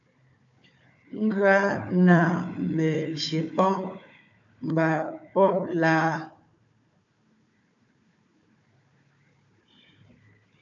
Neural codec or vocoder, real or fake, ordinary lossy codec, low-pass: codec, 16 kHz, 4 kbps, FunCodec, trained on Chinese and English, 50 frames a second; fake; AAC, 64 kbps; 7.2 kHz